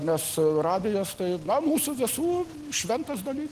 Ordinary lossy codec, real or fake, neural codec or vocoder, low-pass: Opus, 16 kbps; real; none; 14.4 kHz